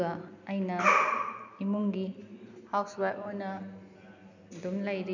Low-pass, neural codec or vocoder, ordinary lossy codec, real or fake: 7.2 kHz; none; none; real